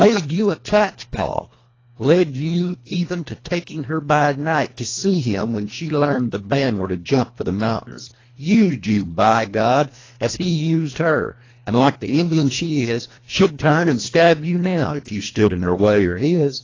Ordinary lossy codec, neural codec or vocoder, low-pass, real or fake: AAC, 32 kbps; codec, 24 kHz, 1.5 kbps, HILCodec; 7.2 kHz; fake